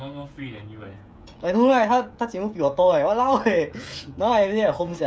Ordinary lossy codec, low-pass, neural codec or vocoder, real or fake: none; none; codec, 16 kHz, 16 kbps, FreqCodec, smaller model; fake